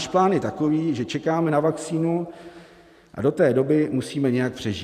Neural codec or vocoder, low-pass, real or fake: vocoder, 44.1 kHz, 128 mel bands every 512 samples, BigVGAN v2; 14.4 kHz; fake